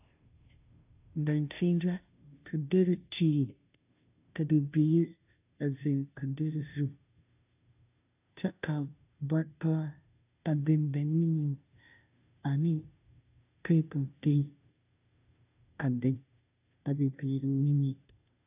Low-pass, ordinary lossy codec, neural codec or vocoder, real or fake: 3.6 kHz; AAC, 32 kbps; codec, 16 kHz, 0.5 kbps, FunCodec, trained on Chinese and English, 25 frames a second; fake